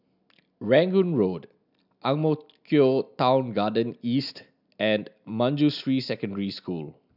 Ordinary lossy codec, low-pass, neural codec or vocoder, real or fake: none; 5.4 kHz; none; real